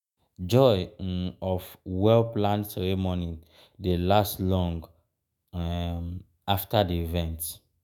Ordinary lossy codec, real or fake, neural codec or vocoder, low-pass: none; fake; autoencoder, 48 kHz, 128 numbers a frame, DAC-VAE, trained on Japanese speech; none